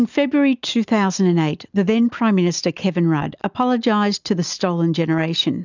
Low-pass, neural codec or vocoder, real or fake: 7.2 kHz; none; real